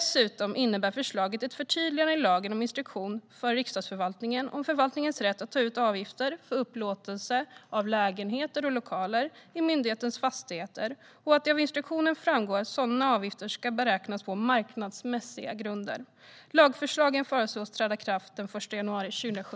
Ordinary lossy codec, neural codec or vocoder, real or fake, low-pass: none; none; real; none